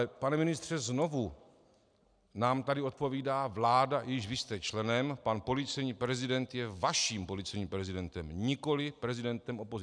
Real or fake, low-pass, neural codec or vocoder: real; 9.9 kHz; none